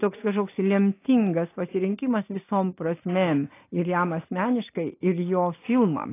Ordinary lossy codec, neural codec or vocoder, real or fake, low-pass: AAC, 24 kbps; none; real; 3.6 kHz